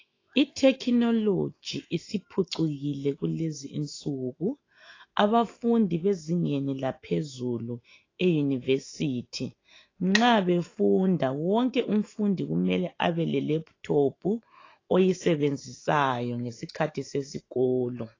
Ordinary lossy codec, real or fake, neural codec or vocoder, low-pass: AAC, 32 kbps; fake; autoencoder, 48 kHz, 128 numbers a frame, DAC-VAE, trained on Japanese speech; 7.2 kHz